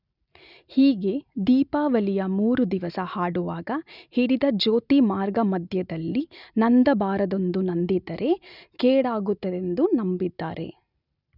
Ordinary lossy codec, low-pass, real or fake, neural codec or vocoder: none; 5.4 kHz; real; none